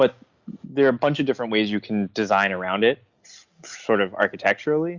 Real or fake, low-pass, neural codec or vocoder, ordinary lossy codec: real; 7.2 kHz; none; Opus, 64 kbps